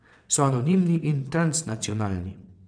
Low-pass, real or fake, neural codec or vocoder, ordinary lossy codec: 9.9 kHz; fake; vocoder, 22.05 kHz, 80 mel bands, WaveNeXt; none